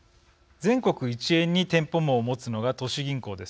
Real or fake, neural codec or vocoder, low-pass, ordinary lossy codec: real; none; none; none